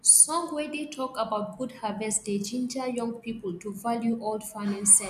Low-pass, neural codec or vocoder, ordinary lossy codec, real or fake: 14.4 kHz; none; none; real